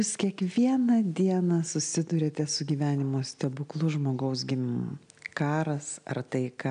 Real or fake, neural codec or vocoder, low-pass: real; none; 9.9 kHz